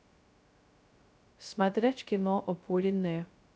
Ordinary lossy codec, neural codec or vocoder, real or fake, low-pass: none; codec, 16 kHz, 0.2 kbps, FocalCodec; fake; none